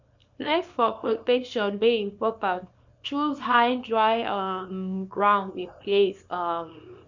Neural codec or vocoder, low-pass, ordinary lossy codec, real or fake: codec, 24 kHz, 0.9 kbps, WavTokenizer, small release; 7.2 kHz; MP3, 64 kbps; fake